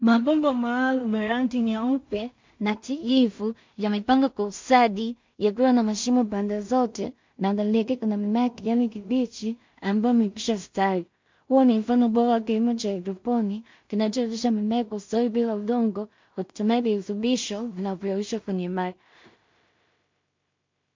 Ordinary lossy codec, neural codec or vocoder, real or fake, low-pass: MP3, 48 kbps; codec, 16 kHz in and 24 kHz out, 0.4 kbps, LongCat-Audio-Codec, two codebook decoder; fake; 7.2 kHz